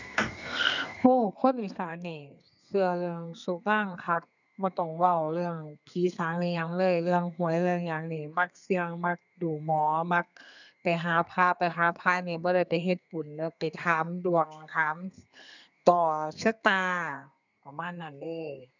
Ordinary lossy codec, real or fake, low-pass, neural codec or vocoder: none; fake; 7.2 kHz; codec, 32 kHz, 1.9 kbps, SNAC